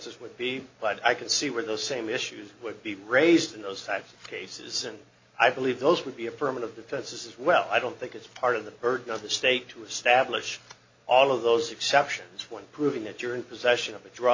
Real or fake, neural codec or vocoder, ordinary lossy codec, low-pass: real; none; MP3, 48 kbps; 7.2 kHz